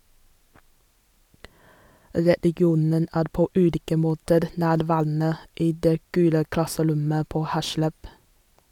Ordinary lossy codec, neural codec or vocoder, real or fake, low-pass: none; none; real; 19.8 kHz